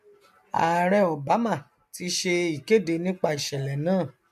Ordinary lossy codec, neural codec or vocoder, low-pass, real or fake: MP3, 64 kbps; none; 14.4 kHz; real